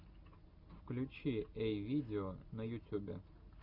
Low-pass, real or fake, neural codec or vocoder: 5.4 kHz; real; none